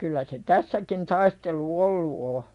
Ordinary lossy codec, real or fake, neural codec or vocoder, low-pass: none; fake; vocoder, 24 kHz, 100 mel bands, Vocos; 10.8 kHz